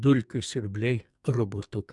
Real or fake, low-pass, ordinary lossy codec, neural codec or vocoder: fake; 10.8 kHz; MP3, 96 kbps; codec, 44.1 kHz, 2.6 kbps, SNAC